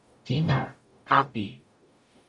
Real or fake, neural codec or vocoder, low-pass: fake; codec, 44.1 kHz, 0.9 kbps, DAC; 10.8 kHz